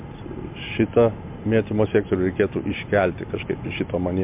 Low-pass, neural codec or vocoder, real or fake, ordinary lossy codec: 3.6 kHz; none; real; MP3, 32 kbps